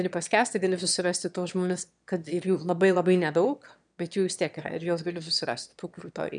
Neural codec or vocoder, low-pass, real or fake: autoencoder, 22.05 kHz, a latent of 192 numbers a frame, VITS, trained on one speaker; 9.9 kHz; fake